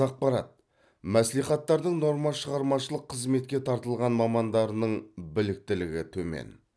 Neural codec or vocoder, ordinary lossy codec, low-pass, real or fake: none; none; none; real